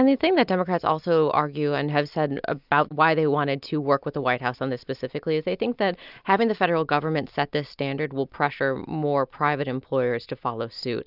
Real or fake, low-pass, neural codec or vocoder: real; 5.4 kHz; none